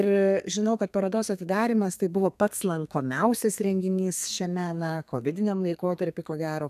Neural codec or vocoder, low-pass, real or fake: codec, 32 kHz, 1.9 kbps, SNAC; 14.4 kHz; fake